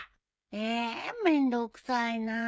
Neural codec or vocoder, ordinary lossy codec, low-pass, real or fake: codec, 16 kHz, 16 kbps, FreqCodec, smaller model; none; none; fake